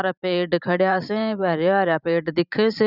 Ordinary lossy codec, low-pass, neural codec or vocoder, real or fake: none; 5.4 kHz; vocoder, 44.1 kHz, 128 mel bands every 512 samples, BigVGAN v2; fake